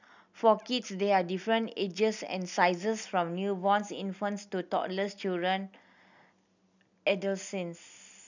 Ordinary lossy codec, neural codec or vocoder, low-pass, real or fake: none; none; 7.2 kHz; real